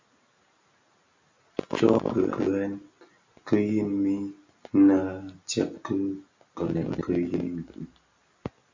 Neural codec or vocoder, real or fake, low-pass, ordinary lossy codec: none; real; 7.2 kHz; MP3, 64 kbps